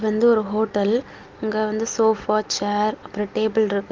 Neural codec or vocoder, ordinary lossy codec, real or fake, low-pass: none; Opus, 24 kbps; real; 7.2 kHz